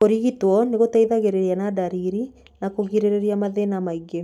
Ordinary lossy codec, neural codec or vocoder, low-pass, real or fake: none; none; 19.8 kHz; real